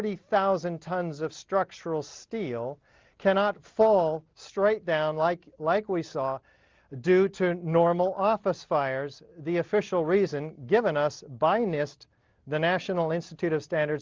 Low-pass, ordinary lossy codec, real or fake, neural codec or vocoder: 7.2 kHz; Opus, 24 kbps; real; none